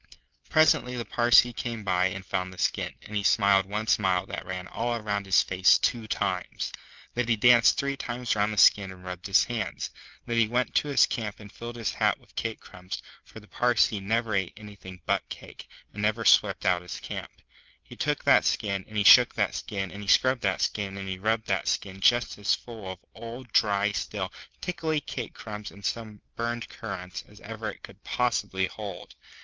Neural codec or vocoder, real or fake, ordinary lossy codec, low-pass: none; real; Opus, 32 kbps; 7.2 kHz